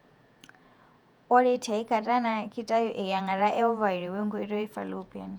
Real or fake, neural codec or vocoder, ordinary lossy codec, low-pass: fake; vocoder, 48 kHz, 128 mel bands, Vocos; none; 19.8 kHz